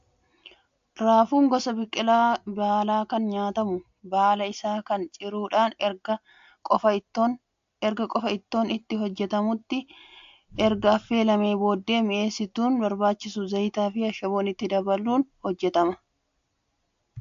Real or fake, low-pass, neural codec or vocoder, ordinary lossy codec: real; 7.2 kHz; none; AAC, 64 kbps